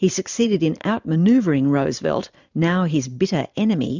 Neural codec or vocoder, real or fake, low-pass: vocoder, 44.1 kHz, 128 mel bands every 512 samples, BigVGAN v2; fake; 7.2 kHz